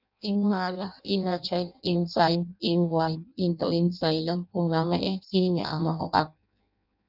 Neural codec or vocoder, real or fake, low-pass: codec, 16 kHz in and 24 kHz out, 0.6 kbps, FireRedTTS-2 codec; fake; 5.4 kHz